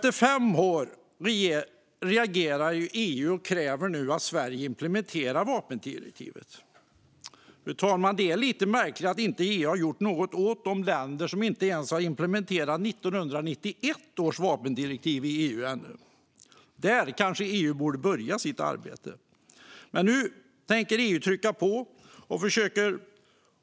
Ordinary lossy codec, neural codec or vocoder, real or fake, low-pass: none; none; real; none